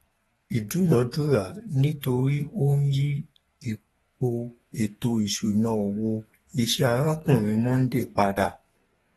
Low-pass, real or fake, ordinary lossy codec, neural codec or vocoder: 14.4 kHz; fake; AAC, 32 kbps; codec, 32 kHz, 1.9 kbps, SNAC